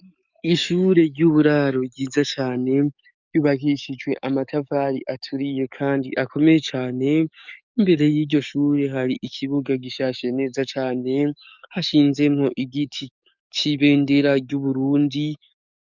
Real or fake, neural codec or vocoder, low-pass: fake; codec, 44.1 kHz, 7.8 kbps, DAC; 7.2 kHz